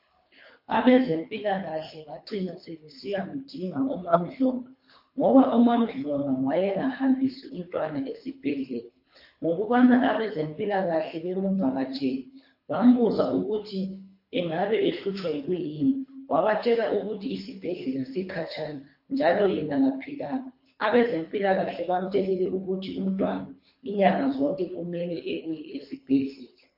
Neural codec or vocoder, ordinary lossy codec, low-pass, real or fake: codec, 24 kHz, 3 kbps, HILCodec; MP3, 32 kbps; 5.4 kHz; fake